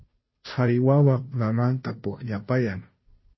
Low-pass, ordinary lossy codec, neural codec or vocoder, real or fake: 7.2 kHz; MP3, 24 kbps; codec, 16 kHz, 0.5 kbps, FunCodec, trained on Chinese and English, 25 frames a second; fake